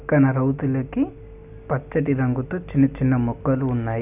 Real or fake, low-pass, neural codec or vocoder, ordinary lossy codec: real; 3.6 kHz; none; none